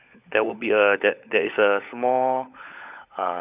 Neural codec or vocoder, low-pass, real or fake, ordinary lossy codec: codec, 16 kHz, 16 kbps, FunCodec, trained on LibriTTS, 50 frames a second; 3.6 kHz; fake; Opus, 64 kbps